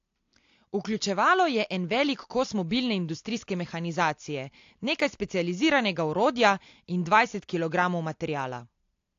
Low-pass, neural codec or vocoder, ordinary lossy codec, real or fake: 7.2 kHz; none; AAC, 48 kbps; real